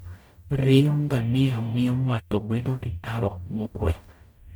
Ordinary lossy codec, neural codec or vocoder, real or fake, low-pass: none; codec, 44.1 kHz, 0.9 kbps, DAC; fake; none